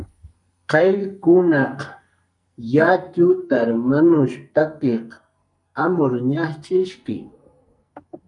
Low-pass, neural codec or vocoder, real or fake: 10.8 kHz; codec, 44.1 kHz, 2.6 kbps, SNAC; fake